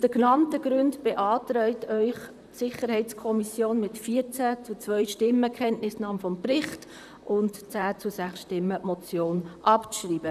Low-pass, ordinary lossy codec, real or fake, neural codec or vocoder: 14.4 kHz; none; fake; vocoder, 44.1 kHz, 128 mel bands, Pupu-Vocoder